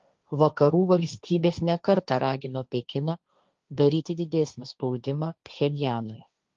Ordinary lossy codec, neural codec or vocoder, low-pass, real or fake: Opus, 32 kbps; codec, 16 kHz, 1.1 kbps, Voila-Tokenizer; 7.2 kHz; fake